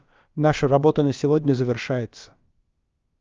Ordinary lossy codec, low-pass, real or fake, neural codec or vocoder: Opus, 32 kbps; 7.2 kHz; fake; codec, 16 kHz, about 1 kbps, DyCAST, with the encoder's durations